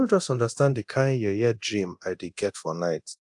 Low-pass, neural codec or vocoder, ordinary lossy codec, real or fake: 10.8 kHz; codec, 24 kHz, 0.9 kbps, DualCodec; AAC, 64 kbps; fake